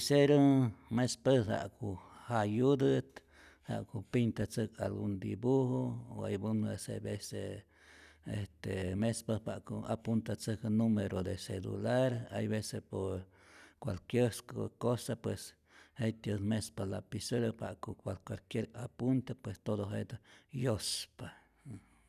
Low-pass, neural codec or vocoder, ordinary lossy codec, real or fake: 14.4 kHz; none; none; real